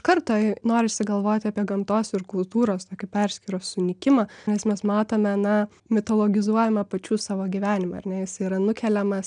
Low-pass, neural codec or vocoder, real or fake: 9.9 kHz; none; real